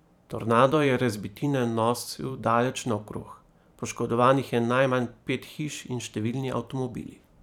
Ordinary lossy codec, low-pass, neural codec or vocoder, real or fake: none; 19.8 kHz; vocoder, 44.1 kHz, 128 mel bands every 512 samples, BigVGAN v2; fake